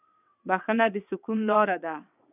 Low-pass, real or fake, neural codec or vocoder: 3.6 kHz; fake; vocoder, 22.05 kHz, 80 mel bands, WaveNeXt